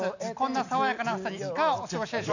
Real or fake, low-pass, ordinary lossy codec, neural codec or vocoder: real; 7.2 kHz; none; none